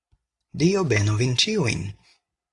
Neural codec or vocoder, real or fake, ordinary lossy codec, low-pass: none; real; AAC, 64 kbps; 10.8 kHz